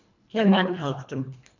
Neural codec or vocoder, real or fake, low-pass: codec, 24 kHz, 1.5 kbps, HILCodec; fake; 7.2 kHz